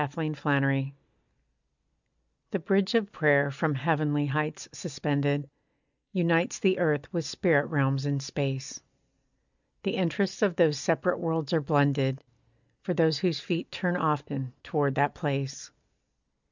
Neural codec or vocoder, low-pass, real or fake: none; 7.2 kHz; real